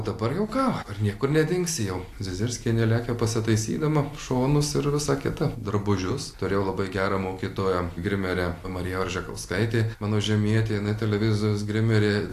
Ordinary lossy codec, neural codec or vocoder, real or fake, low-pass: AAC, 64 kbps; none; real; 14.4 kHz